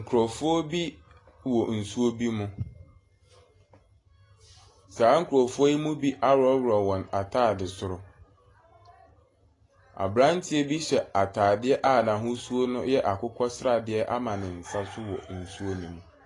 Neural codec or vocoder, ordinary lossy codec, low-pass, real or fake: none; AAC, 32 kbps; 10.8 kHz; real